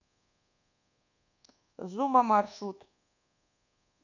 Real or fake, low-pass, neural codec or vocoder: fake; 7.2 kHz; codec, 24 kHz, 1.2 kbps, DualCodec